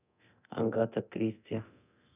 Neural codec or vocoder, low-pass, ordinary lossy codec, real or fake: codec, 24 kHz, 0.9 kbps, DualCodec; 3.6 kHz; none; fake